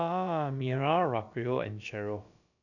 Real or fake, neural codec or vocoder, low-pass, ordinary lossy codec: fake; codec, 16 kHz, about 1 kbps, DyCAST, with the encoder's durations; 7.2 kHz; none